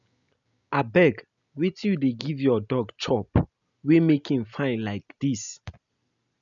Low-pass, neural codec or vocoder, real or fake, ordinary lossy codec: 7.2 kHz; none; real; none